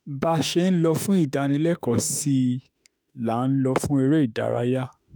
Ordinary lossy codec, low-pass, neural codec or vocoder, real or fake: none; none; autoencoder, 48 kHz, 32 numbers a frame, DAC-VAE, trained on Japanese speech; fake